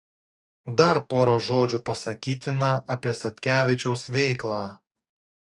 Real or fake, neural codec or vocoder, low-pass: fake; codec, 44.1 kHz, 2.6 kbps, DAC; 10.8 kHz